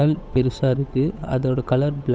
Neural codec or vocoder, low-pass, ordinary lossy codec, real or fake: codec, 16 kHz, 8 kbps, FunCodec, trained on Chinese and English, 25 frames a second; none; none; fake